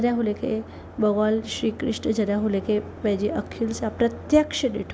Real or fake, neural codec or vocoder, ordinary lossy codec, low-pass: real; none; none; none